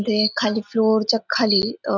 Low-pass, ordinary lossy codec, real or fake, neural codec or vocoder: 7.2 kHz; none; real; none